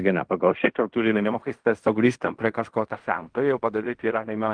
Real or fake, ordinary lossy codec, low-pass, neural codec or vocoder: fake; Opus, 32 kbps; 9.9 kHz; codec, 16 kHz in and 24 kHz out, 0.4 kbps, LongCat-Audio-Codec, fine tuned four codebook decoder